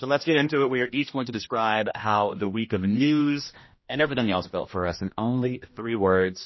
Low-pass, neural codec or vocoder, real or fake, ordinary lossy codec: 7.2 kHz; codec, 16 kHz, 1 kbps, X-Codec, HuBERT features, trained on general audio; fake; MP3, 24 kbps